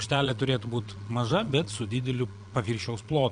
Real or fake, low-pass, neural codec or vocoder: fake; 9.9 kHz; vocoder, 22.05 kHz, 80 mel bands, Vocos